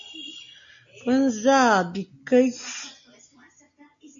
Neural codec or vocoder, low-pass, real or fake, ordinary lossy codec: none; 7.2 kHz; real; AAC, 64 kbps